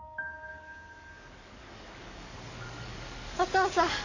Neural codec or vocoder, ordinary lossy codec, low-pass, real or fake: codec, 44.1 kHz, 7.8 kbps, DAC; none; 7.2 kHz; fake